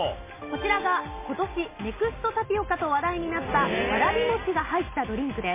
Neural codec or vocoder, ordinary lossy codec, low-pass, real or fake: none; MP3, 16 kbps; 3.6 kHz; real